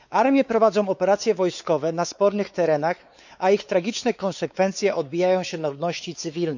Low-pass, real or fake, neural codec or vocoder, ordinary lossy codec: 7.2 kHz; fake; codec, 16 kHz, 4 kbps, X-Codec, WavLM features, trained on Multilingual LibriSpeech; none